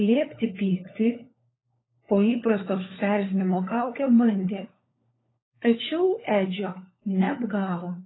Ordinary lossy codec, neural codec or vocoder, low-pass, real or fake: AAC, 16 kbps; codec, 16 kHz, 4 kbps, FunCodec, trained on LibriTTS, 50 frames a second; 7.2 kHz; fake